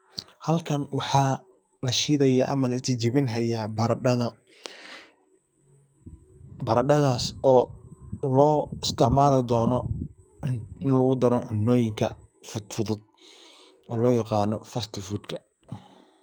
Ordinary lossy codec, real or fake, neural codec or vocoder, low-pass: none; fake; codec, 44.1 kHz, 2.6 kbps, SNAC; none